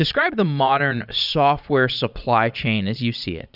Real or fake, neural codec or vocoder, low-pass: fake; vocoder, 22.05 kHz, 80 mel bands, Vocos; 5.4 kHz